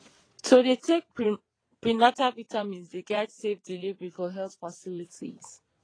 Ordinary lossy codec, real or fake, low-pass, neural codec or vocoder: AAC, 32 kbps; fake; 9.9 kHz; vocoder, 22.05 kHz, 80 mel bands, WaveNeXt